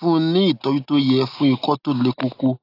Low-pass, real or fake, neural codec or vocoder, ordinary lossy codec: 5.4 kHz; real; none; AAC, 32 kbps